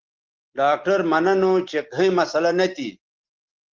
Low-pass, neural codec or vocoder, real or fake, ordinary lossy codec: 7.2 kHz; none; real; Opus, 16 kbps